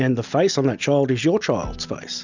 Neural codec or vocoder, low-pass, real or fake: none; 7.2 kHz; real